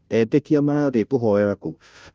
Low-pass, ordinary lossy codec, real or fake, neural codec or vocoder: none; none; fake; codec, 16 kHz, 0.5 kbps, FunCodec, trained on Chinese and English, 25 frames a second